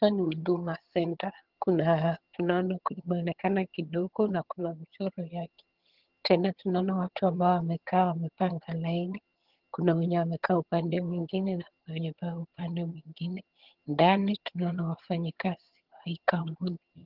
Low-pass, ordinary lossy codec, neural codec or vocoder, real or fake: 5.4 kHz; Opus, 16 kbps; vocoder, 22.05 kHz, 80 mel bands, HiFi-GAN; fake